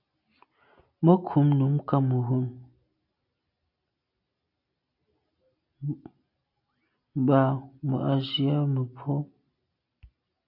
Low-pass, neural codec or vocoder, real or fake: 5.4 kHz; none; real